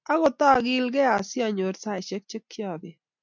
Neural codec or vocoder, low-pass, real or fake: none; 7.2 kHz; real